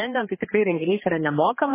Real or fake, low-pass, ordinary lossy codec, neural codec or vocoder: fake; 3.6 kHz; MP3, 16 kbps; codec, 16 kHz, 2 kbps, X-Codec, HuBERT features, trained on general audio